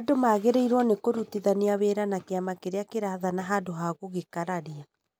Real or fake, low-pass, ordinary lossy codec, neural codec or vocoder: real; none; none; none